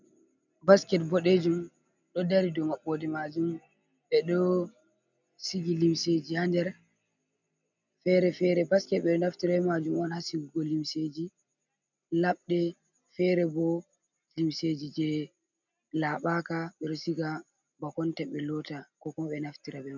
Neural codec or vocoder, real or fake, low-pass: none; real; 7.2 kHz